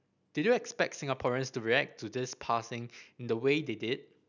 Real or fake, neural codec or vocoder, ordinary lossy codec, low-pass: real; none; none; 7.2 kHz